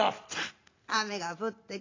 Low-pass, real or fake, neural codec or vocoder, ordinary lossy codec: 7.2 kHz; real; none; AAC, 32 kbps